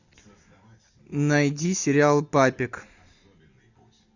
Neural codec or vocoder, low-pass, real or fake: none; 7.2 kHz; real